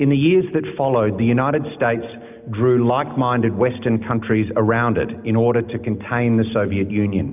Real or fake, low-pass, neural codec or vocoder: real; 3.6 kHz; none